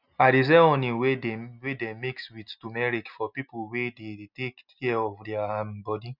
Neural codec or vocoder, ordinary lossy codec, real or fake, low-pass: none; none; real; 5.4 kHz